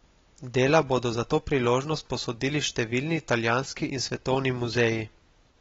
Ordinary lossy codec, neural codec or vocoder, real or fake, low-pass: AAC, 24 kbps; none; real; 7.2 kHz